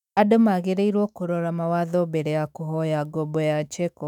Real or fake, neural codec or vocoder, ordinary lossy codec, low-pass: fake; autoencoder, 48 kHz, 128 numbers a frame, DAC-VAE, trained on Japanese speech; none; 19.8 kHz